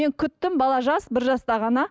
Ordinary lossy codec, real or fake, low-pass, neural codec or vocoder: none; real; none; none